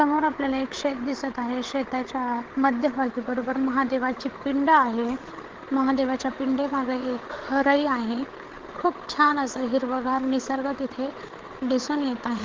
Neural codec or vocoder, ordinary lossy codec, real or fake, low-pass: codec, 16 kHz, 8 kbps, FreqCodec, larger model; Opus, 16 kbps; fake; 7.2 kHz